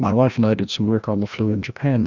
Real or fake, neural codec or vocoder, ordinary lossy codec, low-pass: fake; codec, 16 kHz, 1 kbps, FreqCodec, larger model; Opus, 64 kbps; 7.2 kHz